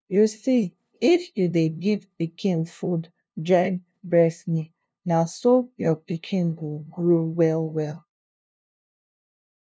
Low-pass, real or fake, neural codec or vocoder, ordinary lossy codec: none; fake; codec, 16 kHz, 0.5 kbps, FunCodec, trained on LibriTTS, 25 frames a second; none